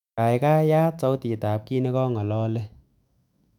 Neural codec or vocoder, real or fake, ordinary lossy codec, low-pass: autoencoder, 48 kHz, 128 numbers a frame, DAC-VAE, trained on Japanese speech; fake; none; 19.8 kHz